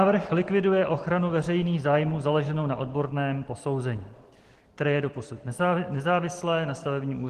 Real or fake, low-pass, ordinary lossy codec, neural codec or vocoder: real; 14.4 kHz; Opus, 16 kbps; none